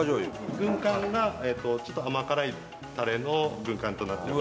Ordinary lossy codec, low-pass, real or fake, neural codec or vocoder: none; none; real; none